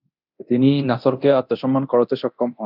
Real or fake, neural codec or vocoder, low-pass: fake; codec, 24 kHz, 0.9 kbps, DualCodec; 5.4 kHz